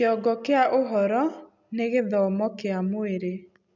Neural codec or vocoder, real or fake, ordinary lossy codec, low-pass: none; real; none; 7.2 kHz